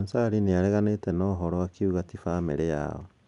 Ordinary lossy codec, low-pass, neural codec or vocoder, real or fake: none; 10.8 kHz; none; real